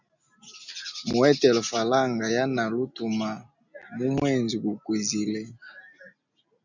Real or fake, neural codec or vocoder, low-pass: real; none; 7.2 kHz